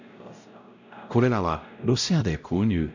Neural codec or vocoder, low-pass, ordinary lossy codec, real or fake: codec, 16 kHz, 0.5 kbps, X-Codec, WavLM features, trained on Multilingual LibriSpeech; 7.2 kHz; none; fake